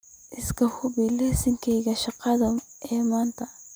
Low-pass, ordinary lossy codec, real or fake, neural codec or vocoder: none; none; real; none